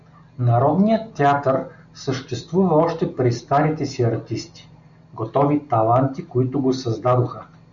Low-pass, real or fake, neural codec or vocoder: 7.2 kHz; real; none